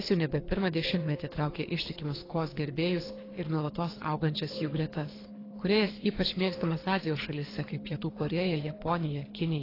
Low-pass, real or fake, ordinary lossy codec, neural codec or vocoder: 5.4 kHz; fake; AAC, 24 kbps; codec, 24 kHz, 3 kbps, HILCodec